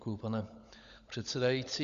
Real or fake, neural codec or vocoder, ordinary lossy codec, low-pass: fake; codec, 16 kHz, 16 kbps, FunCodec, trained on LibriTTS, 50 frames a second; Opus, 64 kbps; 7.2 kHz